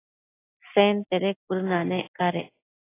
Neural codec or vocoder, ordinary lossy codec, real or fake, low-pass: none; AAC, 16 kbps; real; 3.6 kHz